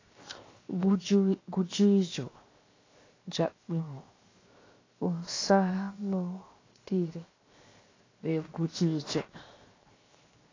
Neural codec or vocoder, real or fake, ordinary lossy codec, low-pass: codec, 16 kHz, 0.7 kbps, FocalCodec; fake; AAC, 32 kbps; 7.2 kHz